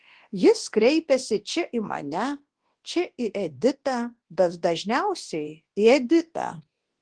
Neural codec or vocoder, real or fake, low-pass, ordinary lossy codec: codec, 24 kHz, 0.9 kbps, WavTokenizer, large speech release; fake; 9.9 kHz; Opus, 16 kbps